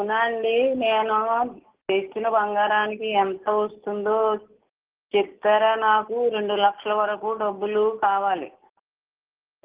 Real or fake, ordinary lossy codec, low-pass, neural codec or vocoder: real; Opus, 16 kbps; 3.6 kHz; none